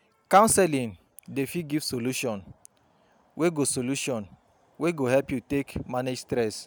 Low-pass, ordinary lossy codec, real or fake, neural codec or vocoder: none; none; real; none